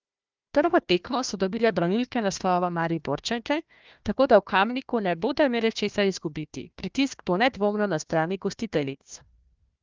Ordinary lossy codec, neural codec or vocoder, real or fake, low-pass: Opus, 32 kbps; codec, 16 kHz, 1 kbps, FunCodec, trained on Chinese and English, 50 frames a second; fake; 7.2 kHz